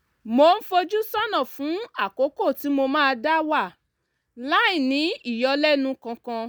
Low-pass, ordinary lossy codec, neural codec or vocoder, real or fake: none; none; none; real